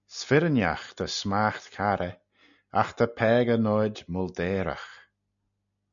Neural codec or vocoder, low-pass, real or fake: none; 7.2 kHz; real